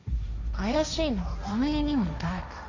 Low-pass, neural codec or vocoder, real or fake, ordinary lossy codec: 7.2 kHz; codec, 16 kHz, 1.1 kbps, Voila-Tokenizer; fake; none